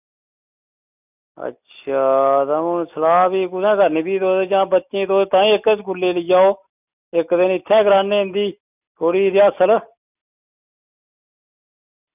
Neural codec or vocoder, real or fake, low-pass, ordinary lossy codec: none; real; 3.6 kHz; none